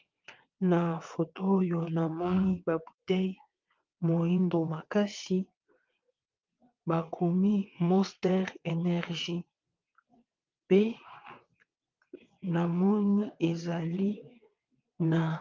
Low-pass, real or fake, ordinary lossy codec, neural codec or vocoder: 7.2 kHz; fake; Opus, 24 kbps; vocoder, 22.05 kHz, 80 mel bands, WaveNeXt